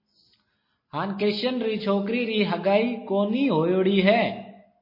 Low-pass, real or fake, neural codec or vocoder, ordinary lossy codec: 5.4 kHz; real; none; MP3, 24 kbps